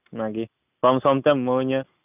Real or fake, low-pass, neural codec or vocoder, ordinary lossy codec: real; 3.6 kHz; none; none